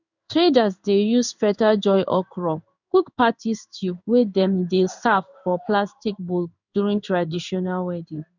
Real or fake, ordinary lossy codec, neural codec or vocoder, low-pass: fake; none; codec, 16 kHz in and 24 kHz out, 1 kbps, XY-Tokenizer; 7.2 kHz